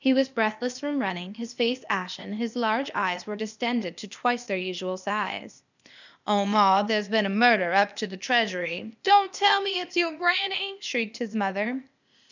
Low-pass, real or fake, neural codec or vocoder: 7.2 kHz; fake; codec, 16 kHz, 0.8 kbps, ZipCodec